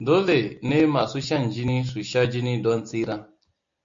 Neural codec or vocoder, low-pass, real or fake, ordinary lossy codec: none; 7.2 kHz; real; MP3, 48 kbps